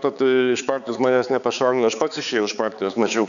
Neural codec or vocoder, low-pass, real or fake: codec, 16 kHz, 4 kbps, X-Codec, HuBERT features, trained on balanced general audio; 7.2 kHz; fake